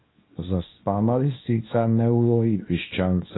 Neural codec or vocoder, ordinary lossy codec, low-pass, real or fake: codec, 24 kHz, 0.9 kbps, WavTokenizer, small release; AAC, 16 kbps; 7.2 kHz; fake